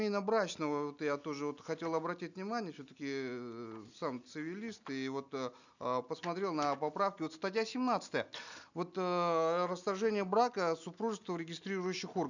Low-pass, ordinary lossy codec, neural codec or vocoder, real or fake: 7.2 kHz; none; none; real